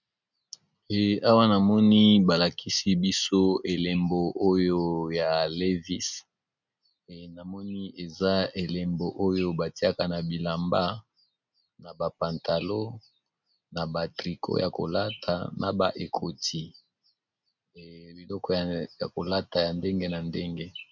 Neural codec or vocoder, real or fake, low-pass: none; real; 7.2 kHz